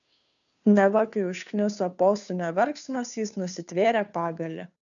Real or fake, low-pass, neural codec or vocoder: fake; 7.2 kHz; codec, 16 kHz, 2 kbps, FunCodec, trained on Chinese and English, 25 frames a second